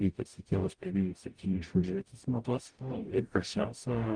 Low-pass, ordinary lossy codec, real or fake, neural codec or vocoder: 9.9 kHz; Opus, 24 kbps; fake; codec, 44.1 kHz, 0.9 kbps, DAC